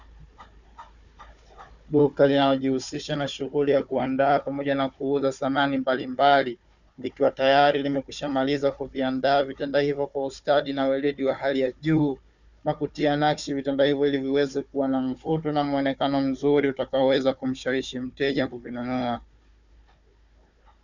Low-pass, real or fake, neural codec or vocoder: 7.2 kHz; fake; codec, 16 kHz, 4 kbps, FunCodec, trained on Chinese and English, 50 frames a second